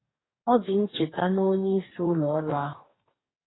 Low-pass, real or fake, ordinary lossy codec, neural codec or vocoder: 7.2 kHz; fake; AAC, 16 kbps; codec, 44.1 kHz, 2.6 kbps, DAC